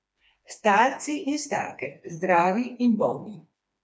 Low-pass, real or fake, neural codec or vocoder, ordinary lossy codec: none; fake; codec, 16 kHz, 2 kbps, FreqCodec, smaller model; none